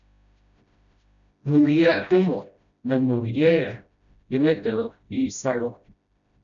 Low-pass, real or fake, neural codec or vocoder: 7.2 kHz; fake; codec, 16 kHz, 0.5 kbps, FreqCodec, smaller model